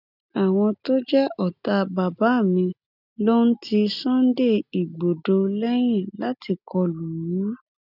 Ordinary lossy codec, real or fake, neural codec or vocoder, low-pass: none; real; none; 5.4 kHz